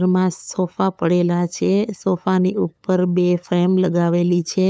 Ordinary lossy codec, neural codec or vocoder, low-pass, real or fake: none; codec, 16 kHz, 8 kbps, FunCodec, trained on LibriTTS, 25 frames a second; none; fake